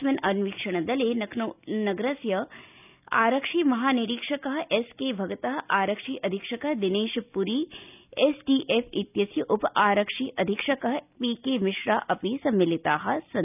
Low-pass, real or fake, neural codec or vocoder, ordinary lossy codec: 3.6 kHz; real; none; none